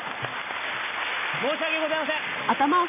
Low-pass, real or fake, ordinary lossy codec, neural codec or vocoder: 3.6 kHz; real; none; none